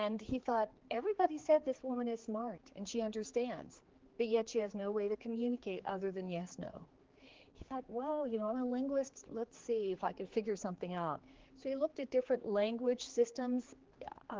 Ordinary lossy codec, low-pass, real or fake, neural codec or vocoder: Opus, 16 kbps; 7.2 kHz; fake; codec, 16 kHz, 4 kbps, X-Codec, HuBERT features, trained on general audio